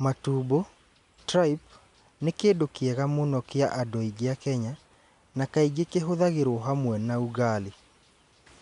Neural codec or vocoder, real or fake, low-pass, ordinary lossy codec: none; real; 10.8 kHz; none